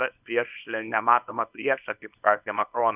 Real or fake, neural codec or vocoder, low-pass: fake; codec, 24 kHz, 0.9 kbps, WavTokenizer, small release; 3.6 kHz